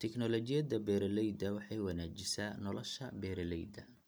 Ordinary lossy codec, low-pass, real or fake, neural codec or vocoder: none; none; real; none